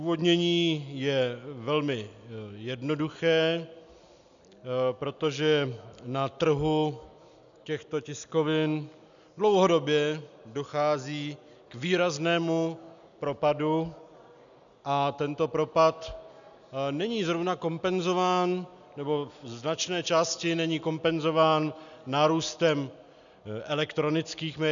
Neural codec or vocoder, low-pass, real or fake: none; 7.2 kHz; real